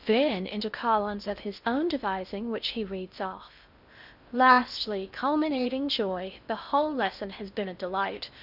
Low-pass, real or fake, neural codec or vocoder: 5.4 kHz; fake; codec, 16 kHz in and 24 kHz out, 0.6 kbps, FocalCodec, streaming, 2048 codes